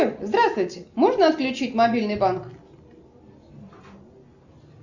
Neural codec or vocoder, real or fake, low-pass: none; real; 7.2 kHz